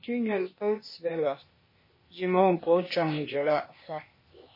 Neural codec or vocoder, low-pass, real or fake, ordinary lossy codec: codec, 16 kHz, 0.8 kbps, ZipCodec; 5.4 kHz; fake; MP3, 24 kbps